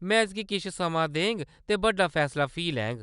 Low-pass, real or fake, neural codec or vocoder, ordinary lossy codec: 10.8 kHz; real; none; none